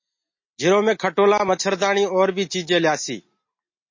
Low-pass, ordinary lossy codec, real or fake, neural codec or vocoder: 7.2 kHz; MP3, 32 kbps; real; none